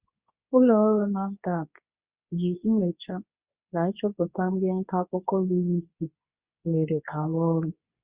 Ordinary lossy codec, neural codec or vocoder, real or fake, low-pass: Opus, 64 kbps; codec, 24 kHz, 0.9 kbps, WavTokenizer, medium speech release version 2; fake; 3.6 kHz